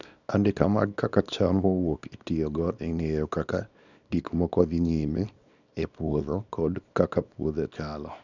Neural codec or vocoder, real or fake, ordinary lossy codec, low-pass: codec, 24 kHz, 0.9 kbps, WavTokenizer, small release; fake; none; 7.2 kHz